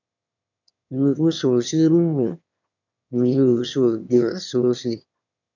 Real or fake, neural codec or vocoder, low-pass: fake; autoencoder, 22.05 kHz, a latent of 192 numbers a frame, VITS, trained on one speaker; 7.2 kHz